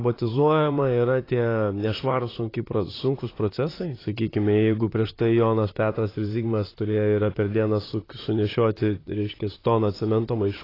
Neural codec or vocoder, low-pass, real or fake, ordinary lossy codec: vocoder, 44.1 kHz, 128 mel bands, Pupu-Vocoder; 5.4 kHz; fake; AAC, 24 kbps